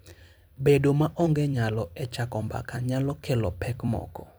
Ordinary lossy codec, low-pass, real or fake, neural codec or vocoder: none; none; fake; vocoder, 44.1 kHz, 128 mel bands every 256 samples, BigVGAN v2